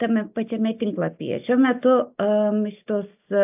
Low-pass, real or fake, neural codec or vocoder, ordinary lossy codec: 3.6 kHz; real; none; AAC, 32 kbps